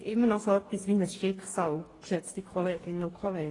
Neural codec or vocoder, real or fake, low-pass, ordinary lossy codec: codec, 44.1 kHz, 2.6 kbps, DAC; fake; 10.8 kHz; AAC, 32 kbps